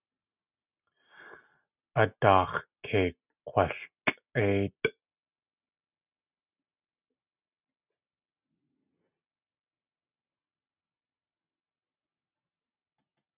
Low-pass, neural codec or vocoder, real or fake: 3.6 kHz; none; real